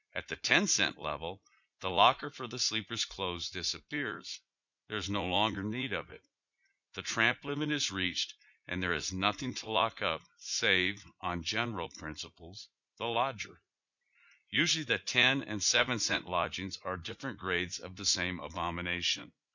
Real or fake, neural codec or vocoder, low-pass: fake; vocoder, 44.1 kHz, 80 mel bands, Vocos; 7.2 kHz